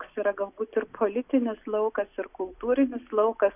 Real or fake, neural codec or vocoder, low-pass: real; none; 3.6 kHz